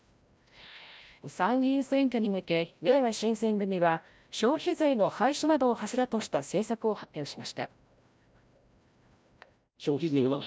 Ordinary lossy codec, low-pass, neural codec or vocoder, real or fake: none; none; codec, 16 kHz, 0.5 kbps, FreqCodec, larger model; fake